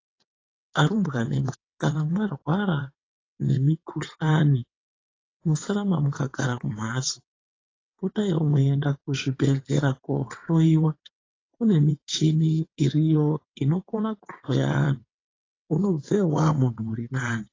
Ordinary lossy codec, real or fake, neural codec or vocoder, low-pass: AAC, 32 kbps; fake; vocoder, 22.05 kHz, 80 mel bands, WaveNeXt; 7.2 kHz